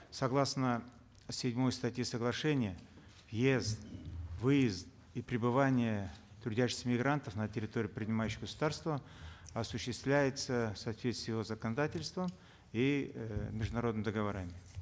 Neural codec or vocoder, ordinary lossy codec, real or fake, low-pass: none; none; real; none